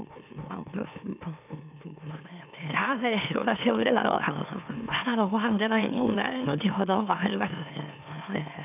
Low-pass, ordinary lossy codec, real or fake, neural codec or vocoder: 3.6 kHz; none; fake; autoencoder, 44.1 kHz, a latent of 192 numbers a frame, MeloTTS